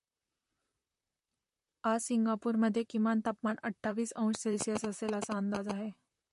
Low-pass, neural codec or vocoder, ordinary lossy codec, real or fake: 14.4 kHz; vocoder, 44.1 kHz, 128 mel bands, Pupu-Vocoder; MP3, 48 kbps; fake